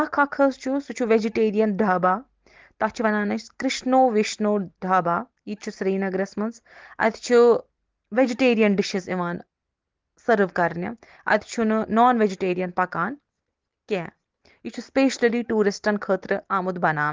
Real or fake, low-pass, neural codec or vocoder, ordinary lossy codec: real; 7.2 kHz; none; Opus, 16 kbps